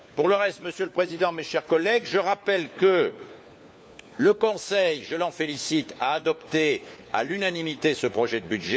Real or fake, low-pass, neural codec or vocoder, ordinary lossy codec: fake; none; codec, 16 kHz, 4 kbps, FunCodec, trained on LibriTTS, 50 frames a second; none